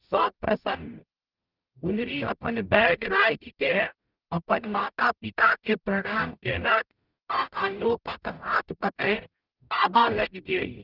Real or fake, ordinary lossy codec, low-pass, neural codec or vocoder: fake; Opus, 24 kbps; 5.4 kHz; codec, 44.1 kHz, 0.9 kbps, DAC